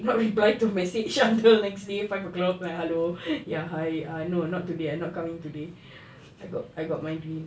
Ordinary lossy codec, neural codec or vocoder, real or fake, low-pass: none; none; real; none